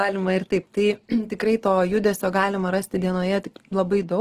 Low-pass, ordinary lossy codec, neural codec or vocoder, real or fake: 14.4 kHz; Opus, 16 kbps; none; real